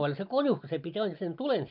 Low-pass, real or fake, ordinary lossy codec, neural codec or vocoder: 5.4 kHz; real; none; none